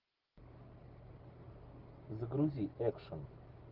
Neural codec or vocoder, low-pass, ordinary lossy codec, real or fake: none; 5.4 kHz; Opus, 16 kbps; real